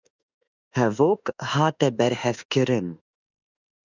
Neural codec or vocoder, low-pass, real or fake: autoencoder, 48 kHz, 32 numbers a frame, DAC-VAE, trained on Japanese speech; 7.2 kHz; fake